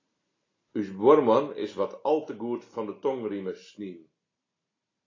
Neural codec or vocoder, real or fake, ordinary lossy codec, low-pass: none; real; AAC, 32 kbps; 7.2 kHz